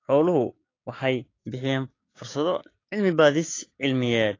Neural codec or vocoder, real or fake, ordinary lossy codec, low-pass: codec, 16 kHz, 6 kbps, DAC; fake; AAC, 32 kbps; 7.2 kHz